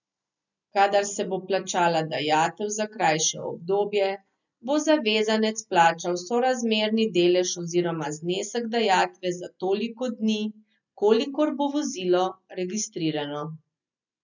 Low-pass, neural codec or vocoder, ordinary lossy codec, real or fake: 7.2 kHz; none; none; real